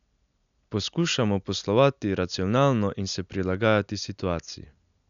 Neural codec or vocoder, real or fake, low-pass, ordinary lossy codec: none; real; 7.2 kHz; none